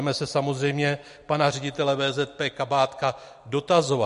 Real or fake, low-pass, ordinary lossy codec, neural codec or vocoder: real; 14.4 kHz; MP3, 48 kbps; none